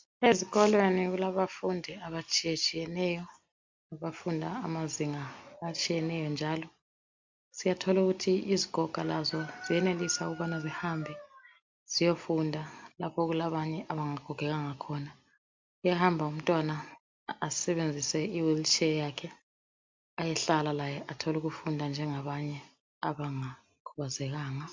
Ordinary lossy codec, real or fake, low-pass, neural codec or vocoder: MP3, 64 kbps; real; 7.2 kHz; none